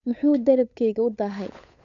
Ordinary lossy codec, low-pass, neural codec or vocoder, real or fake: none; 7.2 kHz; codec, 16 kHz, 8 kbps, FunCodec, trained on Chinese and English, 25 frames a second; fake